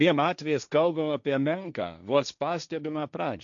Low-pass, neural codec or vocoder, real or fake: 7.2 kHz; codec, 16 kHz, 1.1 kbps, Voila-Tokenizer; fake